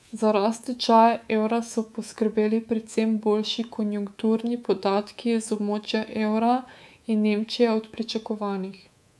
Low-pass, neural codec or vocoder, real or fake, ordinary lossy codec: none; codec, 24 kHz, 3.1 kbps, DualCodec; fake; none